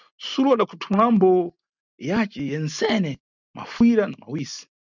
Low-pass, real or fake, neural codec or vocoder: 7.2 kHz; real; none